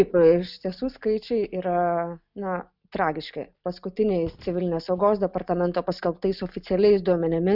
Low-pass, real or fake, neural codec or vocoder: 5.4 kHz; real; none